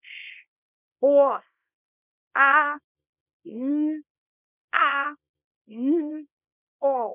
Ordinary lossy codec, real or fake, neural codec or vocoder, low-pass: none; fake; codec, 24 kHz, 0.9 kbps, WavTokenizer, small release; 3.6 kHz